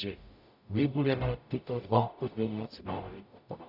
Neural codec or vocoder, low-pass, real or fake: codec, 44.1 kHz, 0.9 kbps, DAC; 5.4 kHz; fake